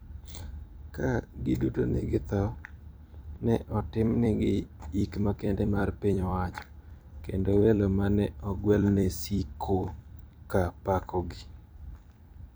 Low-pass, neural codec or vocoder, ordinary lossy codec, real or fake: none; vocoder, 44.1 kHz, 128 mel bands every 256 samples, BigVGAN v2; none; fake